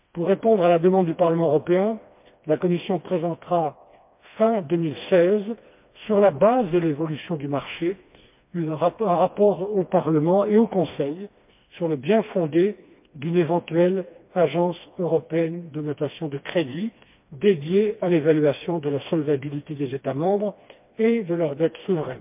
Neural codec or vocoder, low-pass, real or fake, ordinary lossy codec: codec, 16 kHz, 2 kbps, FreqCodec, smaller model; 3.6 kHz; fake; MP3, 32 kbps